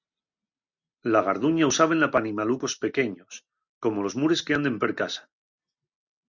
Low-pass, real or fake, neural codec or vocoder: 7.2 kHz; real; none